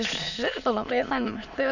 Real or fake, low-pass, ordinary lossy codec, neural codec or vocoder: fake; 7.2 kHz; none; autoencoder, 22.05 kHz, a latent of 192 numbers a frame, VITS, trained on many speakers